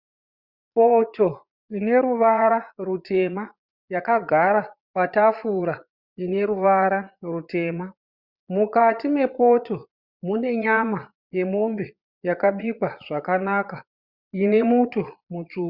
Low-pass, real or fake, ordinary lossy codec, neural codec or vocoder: 5.4 kHz; fake; Opus, 64 kbps; vocoder, 22.05 kHz, 80 mel bands, Vocos